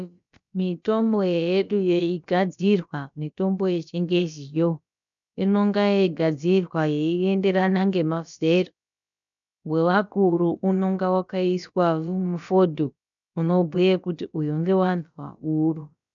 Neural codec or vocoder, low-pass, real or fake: codec, 16 kHz, about 1 kbps, DyCAST, with the encoder's durations; 7.2 kHz; fake